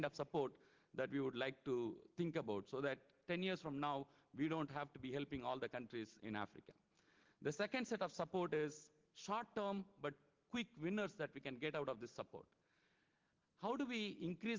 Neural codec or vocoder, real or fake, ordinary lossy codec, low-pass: none; real; Opus, 16 kbps; 7.2 kHz